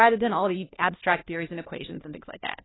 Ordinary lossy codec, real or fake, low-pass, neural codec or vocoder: AAC, 16 kbps; fake; 7.2 kHz; codec, 16 kHz, 1 kbps, FunCodec, trained on LibriTTS, 50 frames a second